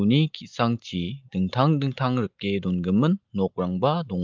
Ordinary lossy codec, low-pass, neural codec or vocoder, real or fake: Opus, 32 kbps; 7.2 kHz; none; real